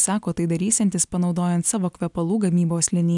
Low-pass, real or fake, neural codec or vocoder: 10.8 kHz; real; none